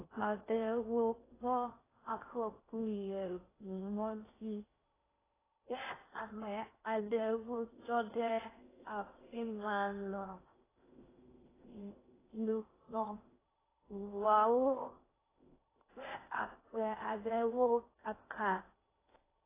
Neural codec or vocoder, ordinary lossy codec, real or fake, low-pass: codec, 16 kHz in and 24 kHz out, 0.6 kbps, FocalCodec, streaming, 2048 codes; AAC, 16 kbps; fake; 3.6 kHz